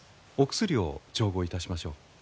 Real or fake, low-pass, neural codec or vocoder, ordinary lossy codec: real; none; none; none